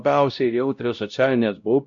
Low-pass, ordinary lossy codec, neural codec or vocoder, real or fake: 7.2 kHz; MP3, 48 kbps; codec, 16 kHz, 0.5 kbps, X-Codec, WavLM features, trained on Multilingual LibriSpeech; fake